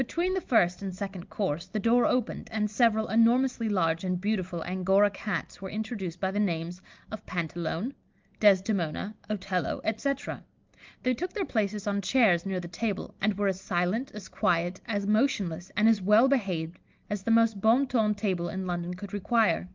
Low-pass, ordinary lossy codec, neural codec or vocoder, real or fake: 7.2 kHz; Opus, 32 kbps; none; real